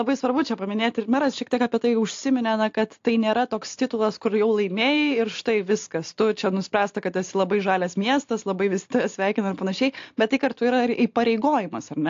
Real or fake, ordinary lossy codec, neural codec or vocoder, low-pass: real; AAC, 48 kbps; none; 7.2 kHz